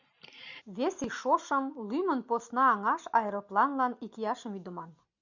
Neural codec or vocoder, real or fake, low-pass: none; real; 7.2 kHz